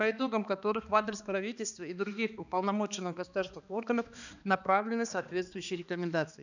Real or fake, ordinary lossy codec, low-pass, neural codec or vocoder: fake; none; 7.2 kHz; codec, 16 kHz, 2 kbps, X-Codec, HuBERT features, trained on balanced general audio